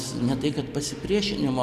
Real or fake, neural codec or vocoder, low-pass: real; none; 14.4 kHz